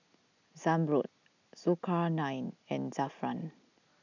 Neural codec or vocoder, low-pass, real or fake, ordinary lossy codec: none; 7.2 kHz; real; none